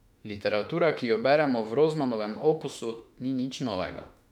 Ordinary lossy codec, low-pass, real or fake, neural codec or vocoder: none; 19.8 kHz; fake; autoencoder, 48 kHz, 32 numbers a frame, DAC-VAE, trained on Japanese speech